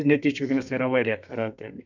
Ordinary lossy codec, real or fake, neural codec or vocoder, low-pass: none; fake; codec, 32 kHz, 1.9 kbps, SNAC; 7.2 kHz